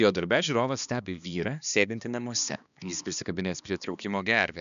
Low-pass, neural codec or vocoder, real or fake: 7.2 kHz; codec, 16 kHz, 2 kbps, X-Codec, HuBERT features, trained on balanced general audio; fake